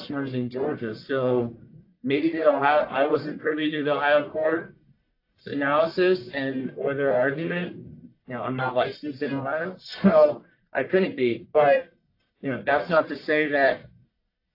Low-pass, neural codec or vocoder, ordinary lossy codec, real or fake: 5.4 kHz; codec, 44.1 kHz, 1.7 kbps, Pupu-Codec; AAC, 32 kbps; fake